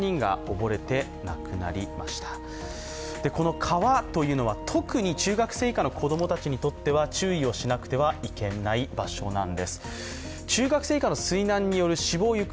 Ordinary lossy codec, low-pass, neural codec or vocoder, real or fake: none; none; none; real